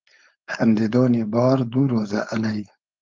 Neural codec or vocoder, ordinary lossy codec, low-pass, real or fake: codec, 16 kHz, 4.8 kbps, FACodec; Opus, 24 kbps; 7.2 kHz; fake